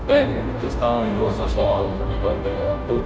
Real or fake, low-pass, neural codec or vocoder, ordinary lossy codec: fake; none; codec, 16 kHz, 0.5 kbps, FunCodec, trained on Chinese and English, 25 frames a second; none